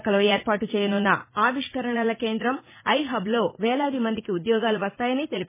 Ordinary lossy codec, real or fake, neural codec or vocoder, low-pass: MP3, 16 kbps; fake; vocoder, 44.1 kHz, 80 mel bands, Vocos; 3.6 kHz